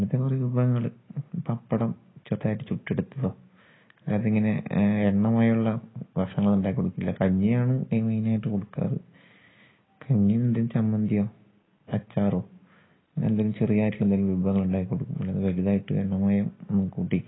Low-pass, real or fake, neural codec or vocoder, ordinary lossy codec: 7.2 kHz; real; none; AAC, 16 kbps